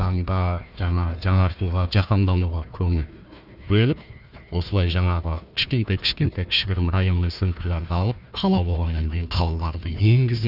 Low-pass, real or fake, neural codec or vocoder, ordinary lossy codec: 5.4 kHz; fake; codec, 16 kHz, 1 kbps, FunCodec, trained on Chinese and English, 50 frames a second; none